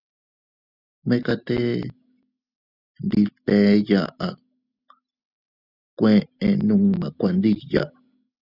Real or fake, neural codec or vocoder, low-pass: real; none; 5.4 kHz